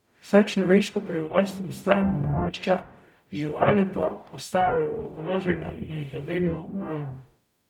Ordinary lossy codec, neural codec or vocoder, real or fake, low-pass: none; codec, 44.1 kHz, 0.9 kbps, DAC; fake; 19.8 kHz